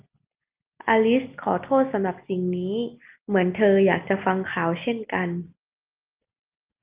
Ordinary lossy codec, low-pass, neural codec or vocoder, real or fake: Opus, 24 kbps; 3.6 kHz; none; real